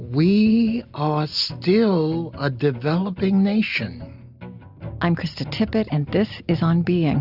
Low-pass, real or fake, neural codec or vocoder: 5.4 kHz; real; none